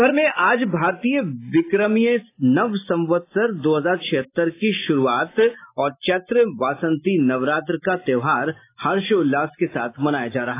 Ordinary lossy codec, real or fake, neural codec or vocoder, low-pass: AAC, 24 kbps; real; none; 3.6 kHz